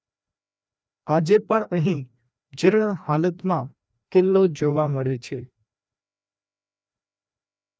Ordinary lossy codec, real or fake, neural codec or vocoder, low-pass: none; fake; codec, 16 kHz, 1 kbps, FreqCodec, larger model; none